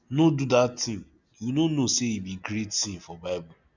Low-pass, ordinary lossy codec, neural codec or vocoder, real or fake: 7.2 kHz; none; none; real